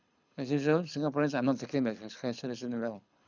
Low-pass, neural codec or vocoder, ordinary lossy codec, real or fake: 7.2 kHz; codec, 24 kHz, 6 kbps, HILCodec; none; fake